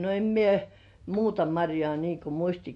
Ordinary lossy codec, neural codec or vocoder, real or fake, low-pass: MP3, 48 kbps; none; real; 10.8 kHz